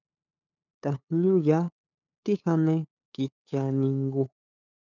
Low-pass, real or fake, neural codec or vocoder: 7.2 kHz; fake; codec, 16 kHz, 8 kbps, FunCodec, trained on LibriTTS, 25 frames a second